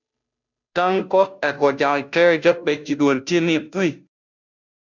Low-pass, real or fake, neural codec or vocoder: 7.2 kHz; fake; codec, 16 kHz, 0.5 kbps, FunCodec, trained on Chinese and English, 25 frames a second